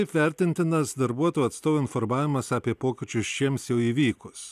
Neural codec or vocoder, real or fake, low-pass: none; real; 14.4 kHz